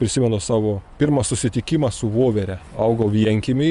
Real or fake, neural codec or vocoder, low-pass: fake; vocoder, 24 kHz, 100 mel bands, Vocos; 10.8 kHz